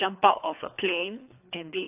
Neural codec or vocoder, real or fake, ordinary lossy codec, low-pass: codec, 24 kHz, 3 kbps, HILCodec; fake; AAC, 32 kbps; 3.6 kHz